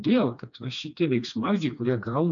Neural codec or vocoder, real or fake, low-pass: codec, 16 kHz, 2 kbps, FreqCodec, smaller model; fake; 7.2 kHz